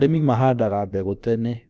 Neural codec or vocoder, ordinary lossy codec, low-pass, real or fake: codec, 16 kHz, about 1 kbps, DyCAST, with the encoder's durations; none; none; fake